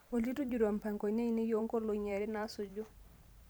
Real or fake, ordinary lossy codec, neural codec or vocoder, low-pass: fake; none; vocoder, 44.1 kHz, 128 mel bands every 512 samples, BigVGAN v2; none